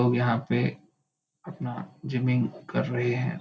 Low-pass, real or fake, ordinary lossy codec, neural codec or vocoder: none; real; none; none